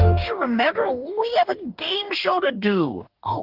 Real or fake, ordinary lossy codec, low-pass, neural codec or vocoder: fake; Opus, 32 kbps; 5.4 kHz; codec, 44.1 kHz, 2.6 kbps, DAC